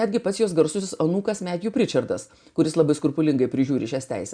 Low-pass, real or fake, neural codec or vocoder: 9.9 kHz; real; none